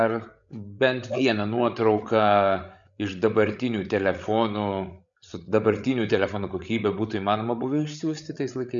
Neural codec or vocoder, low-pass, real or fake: codec, 16 kHz, 8 kbps, FreqCodec, larger model; 7.2 kHz; fake